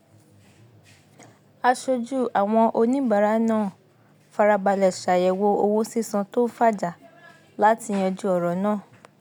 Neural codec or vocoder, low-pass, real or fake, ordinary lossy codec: none; none; real; none